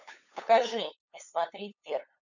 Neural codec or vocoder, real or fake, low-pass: codec, 16 kHz, 8 kbps, FunCodec, trained on LibriTTS, 25 frames a second; fake; 7.2 kHz